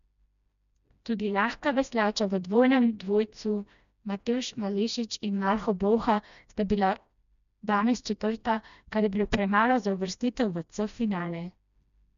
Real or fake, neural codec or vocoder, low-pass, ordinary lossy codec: fake; codec, 16 kHz, 1 kbps, FreqCodec, smaller model; 7.2 kHz; none